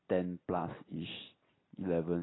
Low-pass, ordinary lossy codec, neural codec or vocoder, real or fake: 7.2 kHz; AAC, 16 kbps; none; real